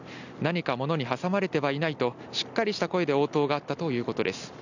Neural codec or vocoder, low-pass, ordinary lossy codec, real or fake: none; 7.2 kHz; none; real